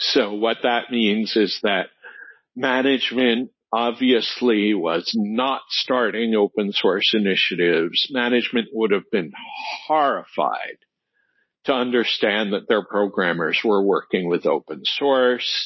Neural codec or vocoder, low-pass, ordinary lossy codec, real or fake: none; 7.2 kHz; MP3, 24 kbps; real